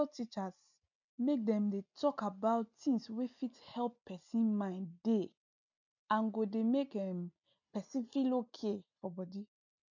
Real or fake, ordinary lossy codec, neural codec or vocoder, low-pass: real; none; none; 7.2 kHz